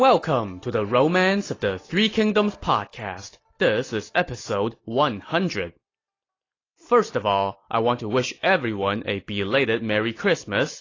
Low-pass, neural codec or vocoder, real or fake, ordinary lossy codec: 7.2 kHz; none; real; AAC, 32 kbps